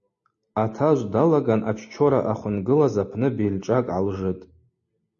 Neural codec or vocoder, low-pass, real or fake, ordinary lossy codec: none; 7.2 kHz; real; MP3, 32 kbps